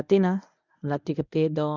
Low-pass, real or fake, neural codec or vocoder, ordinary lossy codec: 7.2 kHz; fake; codec, 24 kHz, 0.9 kbps, WavTokenizer, medium speech release version 1; none